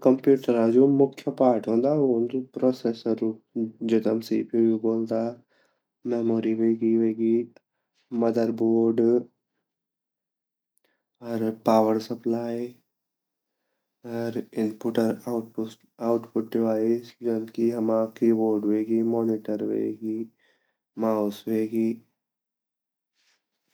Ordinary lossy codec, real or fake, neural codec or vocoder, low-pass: none; real; none; none